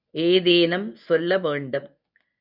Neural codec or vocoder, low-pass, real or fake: codec, 24 kHz, 0.9 kbps, WavTokenizer, medium speech release version 1; 5.4 kHz; fake